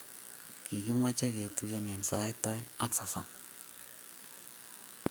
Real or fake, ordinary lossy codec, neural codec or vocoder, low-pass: fake; none; codec, 44.1 kHz, 2.6 kbps, SNAC; none